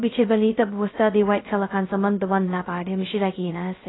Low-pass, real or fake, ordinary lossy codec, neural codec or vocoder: 7.2 kHz; fake; AAC, 16 kbps; codec, 16 kHz, 0.2 kbps, FocalCodec